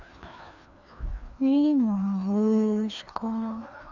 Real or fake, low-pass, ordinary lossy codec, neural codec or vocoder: fake; 7.2 kHz; none; codec, 16 kHz, 2 kbps, FreqCodec, larger model